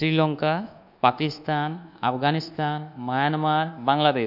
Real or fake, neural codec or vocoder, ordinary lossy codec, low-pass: fake; codec, 24 kHz, 1.2 kbps, DualCodec; none; 5.4 kHz